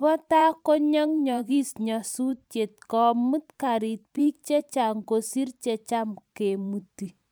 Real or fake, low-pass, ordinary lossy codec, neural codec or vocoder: fake; none; none; vocoder, 44.1 kHz, 128 mel bands every 512 samples, BigVGAN v2